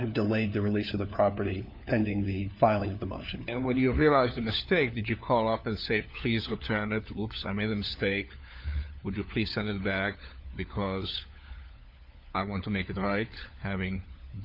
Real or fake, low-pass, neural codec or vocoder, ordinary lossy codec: fake; 5.4 kHz; codec, 16 kHz, 4 kbps, FunCodec, trained on LibriTTS, 50 frames a second; MP3, 48 kbps